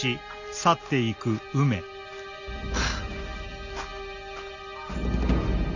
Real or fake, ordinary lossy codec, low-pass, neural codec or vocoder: real; none; 7.2 kHz; none